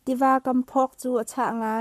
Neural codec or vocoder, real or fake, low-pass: codec, 44.1 kHz, 7.8 kbps, Pupu-Codec; fake; 14.4 kHz